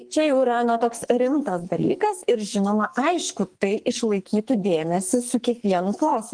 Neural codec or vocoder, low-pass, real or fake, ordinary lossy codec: codec, 44.1 kHz, 2.6 kbps, SNAC; 9.9 kHz; fake; Opus, 24 kbps